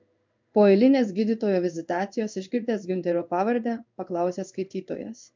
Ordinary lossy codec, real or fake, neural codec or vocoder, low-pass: MP3, 64 kbps; fake; codec, 16 kHz in and 24 kHz out, 1 kbps, XY-Tokenizer; 7.2 kHz